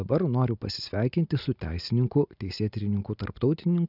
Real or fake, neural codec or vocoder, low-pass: real; none; 5.4 kHz